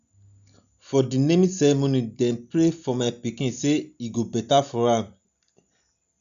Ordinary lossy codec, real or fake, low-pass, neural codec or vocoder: none; real; 7.2 kHz; none